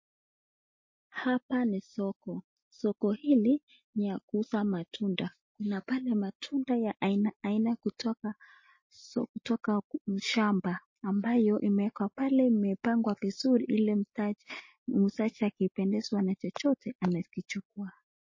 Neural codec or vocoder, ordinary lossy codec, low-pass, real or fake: none; MP3, 32 kbps; 7.2 kHz; real